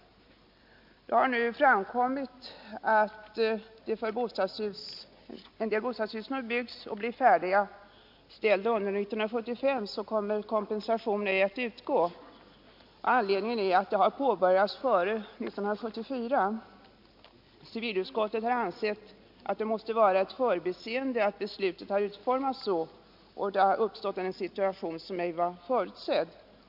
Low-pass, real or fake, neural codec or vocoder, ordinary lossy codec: 5.4 kHz; real; none; MP3, 48 kbps